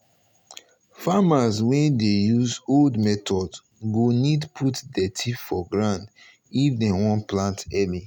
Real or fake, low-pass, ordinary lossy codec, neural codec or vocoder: real; 19.8 kHz; none; none